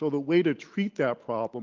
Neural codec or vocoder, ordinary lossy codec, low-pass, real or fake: none; Opus, 24 kbps; 7.2 kHz; real